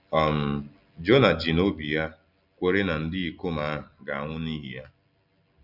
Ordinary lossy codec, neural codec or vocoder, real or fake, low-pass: none; none; real; 5.4 kHz